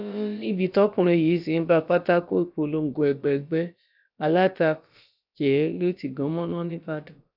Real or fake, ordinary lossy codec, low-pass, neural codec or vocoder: fake; none; 5.4 kHz; codec, 16 kHz, about 1 kbps, DyCAST, with the encoder's durations